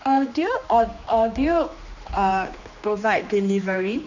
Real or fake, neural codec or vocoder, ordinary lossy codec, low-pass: fake; codec, 16 kHz, 2 kbps, X-Codec, HuBERT features, trained on general audio; none; 7.2 kHz